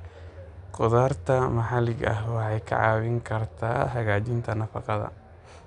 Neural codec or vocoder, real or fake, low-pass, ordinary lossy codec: none; real; 9.9 kHz; none